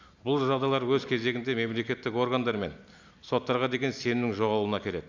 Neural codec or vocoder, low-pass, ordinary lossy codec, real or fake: none; 7.2 kHz; none; real